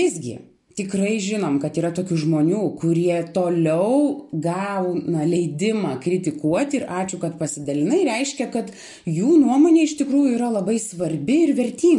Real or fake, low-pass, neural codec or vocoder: real; 10.8 kHz; none